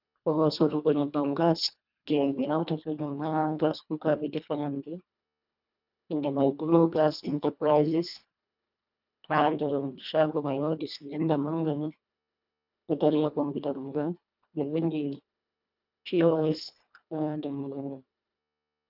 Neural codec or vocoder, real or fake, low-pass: codec, 24 kHz, 1.5 kbps, HILCodec; fake; 5.4 kHz